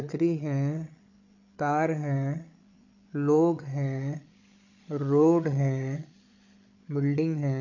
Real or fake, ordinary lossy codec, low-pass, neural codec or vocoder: fake; none; 7.2 kHz; codec, 16 kHz, 8 kbps, FreqCodec, larger model